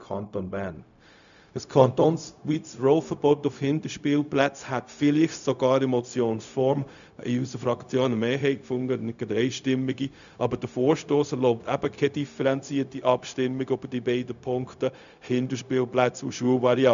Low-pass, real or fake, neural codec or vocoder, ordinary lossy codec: 7.2 kHz; fake; codec, 16 kHz, 0.4 kbps, LongCat-Audio-Codec; none